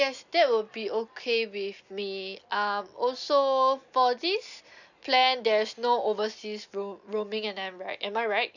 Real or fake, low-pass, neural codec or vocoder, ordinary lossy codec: real; 7.2 kHz; none; none